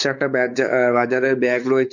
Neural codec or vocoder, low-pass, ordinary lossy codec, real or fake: codec, 16 kHz, 4 kbps, X-Codec, WavLM features, trained on Multilingual LibriSpeech; 7.2 kHz; none; fake